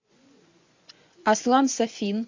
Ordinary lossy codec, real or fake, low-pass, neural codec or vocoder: MP3, 64 kbps; fake; 7.2 kHz; vocoder, 24 kHz, 100 mel bands, Vocos